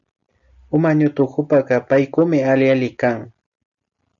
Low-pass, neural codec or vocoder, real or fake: 7.2 kHz; none; real